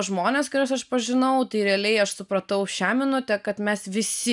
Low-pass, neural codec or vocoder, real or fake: 10.8 kHz; none; real